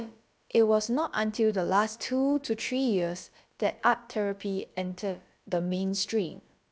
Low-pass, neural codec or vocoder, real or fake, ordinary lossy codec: none; codec, 16 kHz, about 1 kbps, DyCAST, with the encoder's durations; fake; none